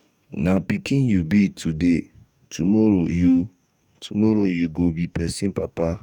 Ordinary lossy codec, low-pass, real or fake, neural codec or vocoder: none; 19.8 kHz; fake; codec, 44.1 kHz, 2.6 kbps, DAC